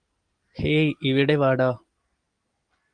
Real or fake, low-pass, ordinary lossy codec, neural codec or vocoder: real; 9.9 kHz; Opus, 32 kbps; none